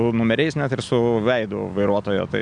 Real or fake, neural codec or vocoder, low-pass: real; none; 9.9 kHz